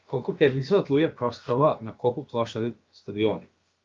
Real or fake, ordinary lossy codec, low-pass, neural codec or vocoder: fake; Opus, 32 kbps; 7.2 kHz; codec, 16 kHz, about 1 kbps, DyCAST, with the encoder's durations